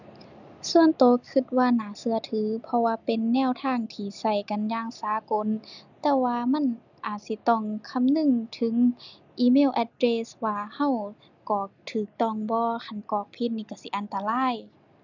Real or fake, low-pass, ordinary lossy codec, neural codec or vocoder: real; 7.2 kHz; none; none